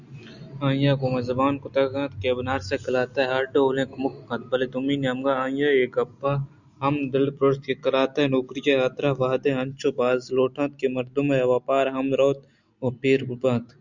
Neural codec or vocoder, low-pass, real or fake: none; 7.2 kHz; real